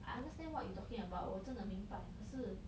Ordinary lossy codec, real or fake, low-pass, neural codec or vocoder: none; real; none; none